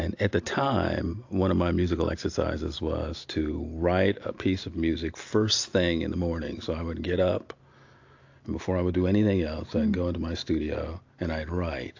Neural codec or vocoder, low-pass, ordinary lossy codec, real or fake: none; 7.2 kHz; AAC, 48 kbps; real